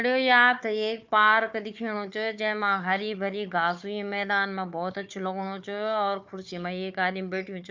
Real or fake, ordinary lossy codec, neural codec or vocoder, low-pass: fake; MP3, 64 kbps; codec, 16 kHz, 4 kbps, FunCodec, trained on Chinese and English, 50 frames a second; 7.2 kHz